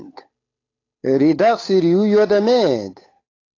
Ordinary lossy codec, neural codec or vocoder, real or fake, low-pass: AAC, 32 kbps; codec, 16 kHz, 8 kbps, FunCodec, trained on Chinese and English, 25 frames a second; fake; 7.2 kHz